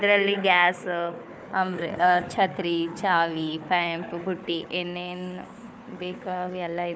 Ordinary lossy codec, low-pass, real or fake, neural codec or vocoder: none; none; fake; codec, 16 kHz, 4 kbps, FunCodec, trained on Chinese and English, 50 frames a second